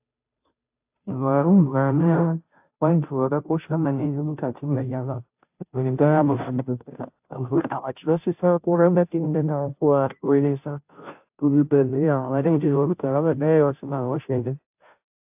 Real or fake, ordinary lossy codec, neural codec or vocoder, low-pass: fake; AAC, 32 kbps; codec, 16 kHz, 0.5 kbps, FunCodec, trained on Chinese and English, 25 frames a second; 3.6 kHz